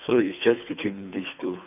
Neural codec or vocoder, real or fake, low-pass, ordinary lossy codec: codec, 24 kHz, 3 kbps, HILCodec; fake; 3.6 kHz; none